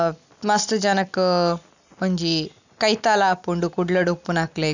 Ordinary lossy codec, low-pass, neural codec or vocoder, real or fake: none; 7.2 kHz; none; real